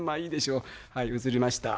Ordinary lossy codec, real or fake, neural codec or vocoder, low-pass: none; real; none; none